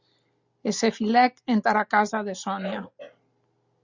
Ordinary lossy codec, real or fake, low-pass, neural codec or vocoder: Opus, 64 kbps; real; 7.2 kHz; none